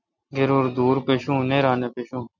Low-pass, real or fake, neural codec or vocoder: 7.2 kHz; real; none